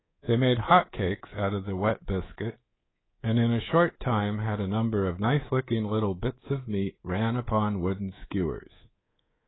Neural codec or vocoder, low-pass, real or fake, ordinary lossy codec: codec, 24 kHz, 3.1 kbps, DualCodec; 7.2 kHz; fake; AAC, 16 kbps